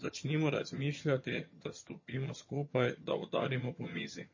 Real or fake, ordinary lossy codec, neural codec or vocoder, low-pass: fake; MP3, 32 kbps; vocoder, 22.05 kHz, 80 mel bands, HiFi-GAN; 7.2 kHz